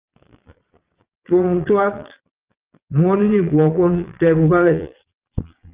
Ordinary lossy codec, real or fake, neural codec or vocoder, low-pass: Opus, 24 kbps; fake; vocoder, 22.05 kHz, 80 mel bands, Vocos; 3.6 kHz